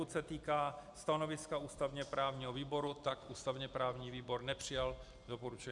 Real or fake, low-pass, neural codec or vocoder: real; 10.8 kHz; none